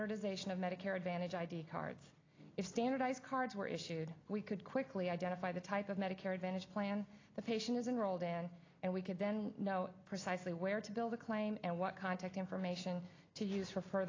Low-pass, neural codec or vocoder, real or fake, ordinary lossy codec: 7.2 kHz; none; real; AAC, 32 kbps